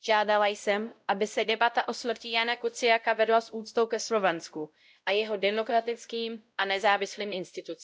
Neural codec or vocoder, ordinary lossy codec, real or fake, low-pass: codec, 16 kHz, 0.5 kbps, X-Codec, WavLM features, trained on Multilingual LibriSpeech; none; fake; none